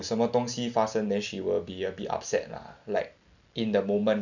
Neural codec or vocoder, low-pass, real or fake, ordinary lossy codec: none; 7.2 kHz; real; none